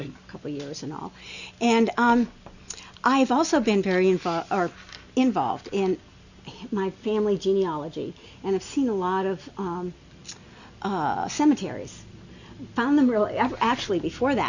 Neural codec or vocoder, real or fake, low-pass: none; real; 7.2 kHz